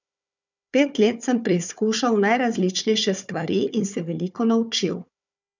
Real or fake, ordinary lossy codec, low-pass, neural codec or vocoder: fake; none; 7.2 kHz; codec, 16 kHz, 4 kbps, FunCodec, trained on Chinese and English, 50 frames a second